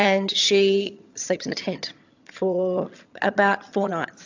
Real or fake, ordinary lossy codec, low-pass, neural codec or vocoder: fake; AAC, 48 kbps; 7.2 kHz; vocoder, 22.05 kHz, 80 mel bands, HiFi-GAN